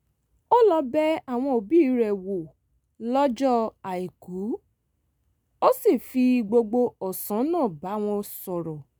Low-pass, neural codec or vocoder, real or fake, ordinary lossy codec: none; none; real; none